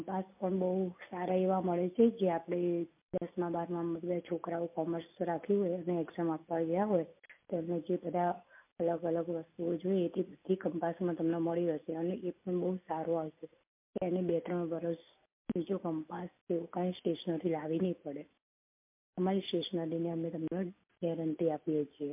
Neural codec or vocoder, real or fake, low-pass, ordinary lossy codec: none; real; 3.6 kHz; MP3, 32 kbps